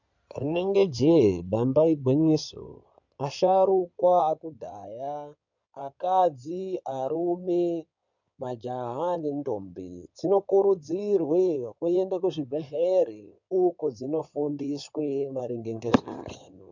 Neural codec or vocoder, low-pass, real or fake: codec, 16 kHz in and 24 kHz out, 2.2 kbps, FireRedTTS-2 codec; 7.2 kHz; fake